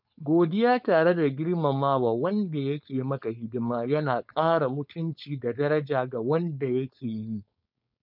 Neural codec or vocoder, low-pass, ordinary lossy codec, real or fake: codec, 16 kHz, 4.8 kbps, FACodec; 5.4 kHz; none; fake